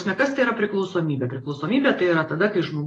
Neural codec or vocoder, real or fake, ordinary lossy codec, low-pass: none; real; AAC, 32 kbps; 10.8 kHz